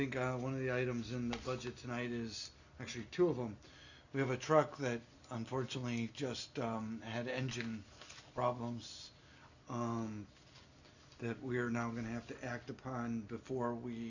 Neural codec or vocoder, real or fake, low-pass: none; real; 7.2 kHz